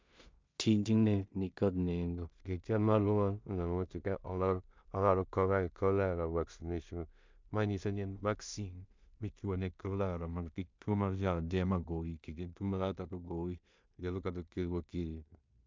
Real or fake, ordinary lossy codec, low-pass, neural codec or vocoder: fake; MP3, 64 kbps; 7.2 kHz; codec, 16 kHz in and 24 kHz out, 0.4 kbps, LongCat-Audio-Codec, two codebook decoder